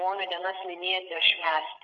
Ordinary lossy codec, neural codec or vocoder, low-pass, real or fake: MP3, 96 kbps; codec, 16 kHz, 8 kbps, FreqCodec, larger model; 7.2 kHz; fake